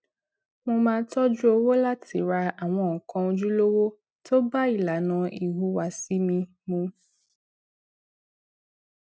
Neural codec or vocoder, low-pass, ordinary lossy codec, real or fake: none; none; none; real